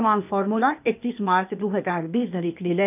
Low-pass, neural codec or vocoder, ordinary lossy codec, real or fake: 3.6 kHz; codec, 16 kHz, 0.8 kbps, ZipCodec; none; fake